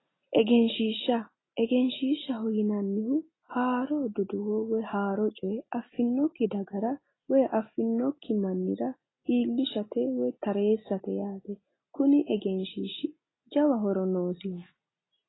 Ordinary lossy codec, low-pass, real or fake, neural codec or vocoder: AAC, 16 kbps; 7.2 kHz; real; none